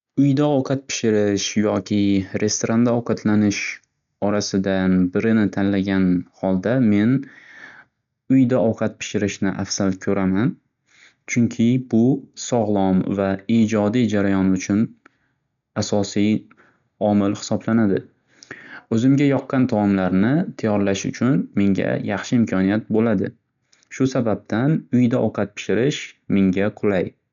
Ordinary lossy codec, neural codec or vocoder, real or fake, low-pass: none; none; real; 7.2 kHz